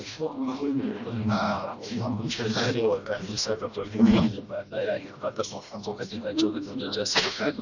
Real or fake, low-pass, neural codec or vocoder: fake; 7.2 kHz; codec, 16 kHz, 1 kbps, FreqCodec, smaller model